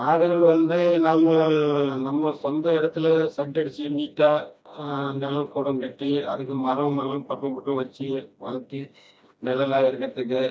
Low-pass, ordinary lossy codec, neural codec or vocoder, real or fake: none; none; codec, 16 kHz, 1 kbps, FreqCodec, smaller model; fake